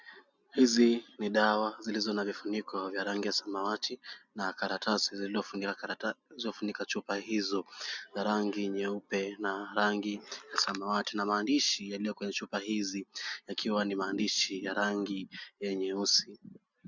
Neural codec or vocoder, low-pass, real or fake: none; 7.2 kHz; real